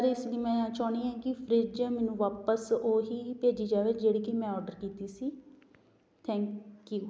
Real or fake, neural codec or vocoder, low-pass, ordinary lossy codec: real; none; none; none